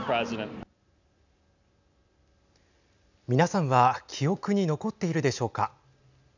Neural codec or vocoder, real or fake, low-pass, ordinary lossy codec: none; real; 7.2 kHz; none